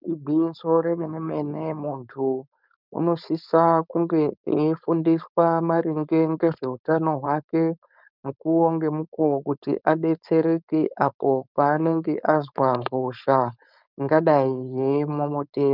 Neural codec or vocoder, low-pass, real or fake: codec, 16 kHz, 4.8 kbps, FACodec; 5.4 kHz; fake